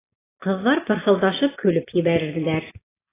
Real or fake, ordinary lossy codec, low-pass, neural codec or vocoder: real; AAC, 16 kbps; 3.6 kHz; none